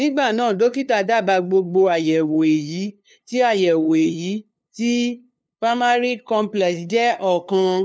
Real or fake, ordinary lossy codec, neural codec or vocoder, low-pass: fake; none; codec, 16 kHz, 2 kbps, FunCodec, trained on LibriTTS, 25 frames a second; none